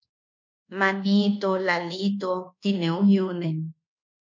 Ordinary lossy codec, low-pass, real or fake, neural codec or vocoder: MP3, 48 kbps; 7.2 kHz; fake; codec, 24 kHz, 1.2 kbps, DualCodec